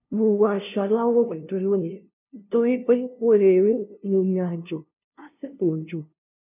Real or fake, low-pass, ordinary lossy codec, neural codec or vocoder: fake; 3.6 kHz; none; codec, 16 kHz, 0.5 kbps, FunCodec, trained on LibriTTS, 25 frames a second